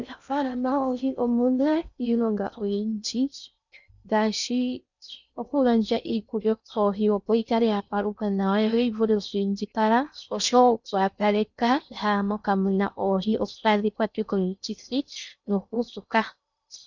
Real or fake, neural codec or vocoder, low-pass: fake; codec, 16 kHz in and 24 kHz out, 0.6 kbps, FocalCodec, streaming, 2048 codes; 7.2 kHz